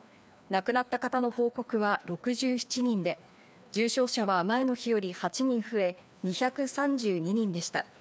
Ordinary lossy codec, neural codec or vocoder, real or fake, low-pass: none; codec, 16 kHz, 2 kbps, FreqCodec, larger model; fake; none